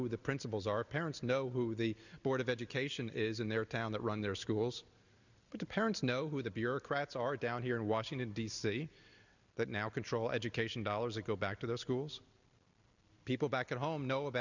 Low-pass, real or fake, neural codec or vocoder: 7.2 kHz; real; none